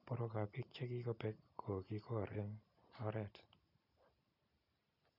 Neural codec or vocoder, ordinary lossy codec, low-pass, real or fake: none; none; 5.4 kHz; real